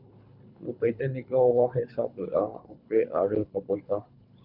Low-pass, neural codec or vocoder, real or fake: 5.4 kHz; codec, 24 kHz, 3 kbps, HILCodec; fake